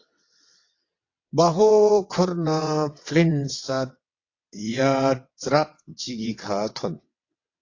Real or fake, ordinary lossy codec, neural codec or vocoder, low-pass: fake; AAC, 48 kbps; vocoder, 22.05 kHz, 80 mel bands, WaveNeXt; 7.2 kHz